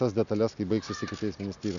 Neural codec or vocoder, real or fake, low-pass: none; real; 7.2 kHz